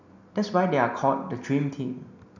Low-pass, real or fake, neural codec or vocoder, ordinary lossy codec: 7.2 kHz; real; none; none